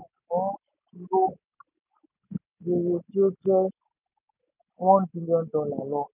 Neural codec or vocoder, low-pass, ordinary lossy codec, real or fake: none; 3.6 kHz; none; real